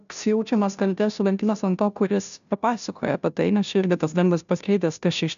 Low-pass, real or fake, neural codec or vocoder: 7.2 kHz; fake; codec, 16 kHz, 0.5 kbps, FunCodec, trained on Chinese and English, 25 frames a second